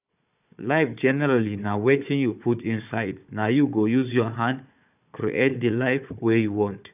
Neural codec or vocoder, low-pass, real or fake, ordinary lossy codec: codec, 16 kHz, 4 kbps, FunCodec, trained on Chinese and English, 50 frames a second; 3.6 kHz; fake; none